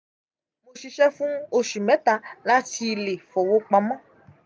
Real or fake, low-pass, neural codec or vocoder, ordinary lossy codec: real; none; none; none